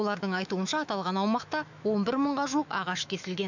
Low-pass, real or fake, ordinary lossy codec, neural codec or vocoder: 7.2 kHz; fake; none; autoencoder, 48 kHz, 128 numbers a frame, DAC-VAE, trained on Japanese speech